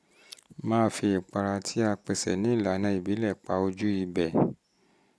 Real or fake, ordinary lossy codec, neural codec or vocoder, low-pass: real; none; none; none